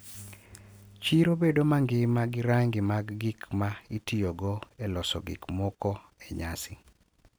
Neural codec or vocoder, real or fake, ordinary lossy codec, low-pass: none; real; none; none